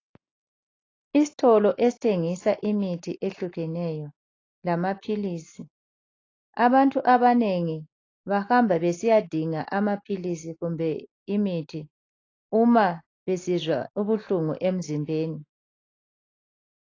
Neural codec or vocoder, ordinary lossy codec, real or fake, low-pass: none; AAC, 32 kbps; real; 7.2 kHz